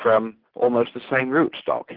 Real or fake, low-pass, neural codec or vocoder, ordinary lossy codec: real; 5.4 kHz; none; Opus, 16 kbps